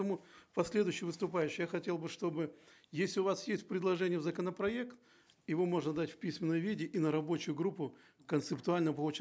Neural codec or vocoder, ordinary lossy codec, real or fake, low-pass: none; none; real; none